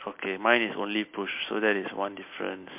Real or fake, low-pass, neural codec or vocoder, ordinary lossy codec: real; 3.6 kHz; none; MP3, 32 kbps